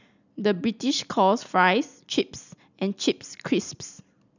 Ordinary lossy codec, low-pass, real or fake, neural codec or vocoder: none; 7.2 kHz; real; none